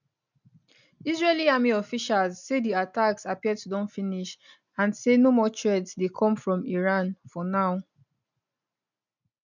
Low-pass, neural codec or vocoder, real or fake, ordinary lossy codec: 7.2 kHz; none; real; none